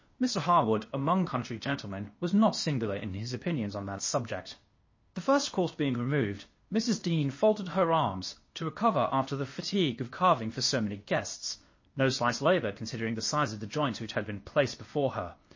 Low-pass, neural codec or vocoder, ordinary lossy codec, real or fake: 7.2 kHz; codec, 16 kHz, 0.8 kbps, ZipCodec; MP3, 32 kbps; fake